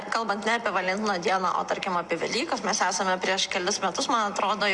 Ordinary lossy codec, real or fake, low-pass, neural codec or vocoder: Opus, 64 kbps; real; 10.8 kHz; none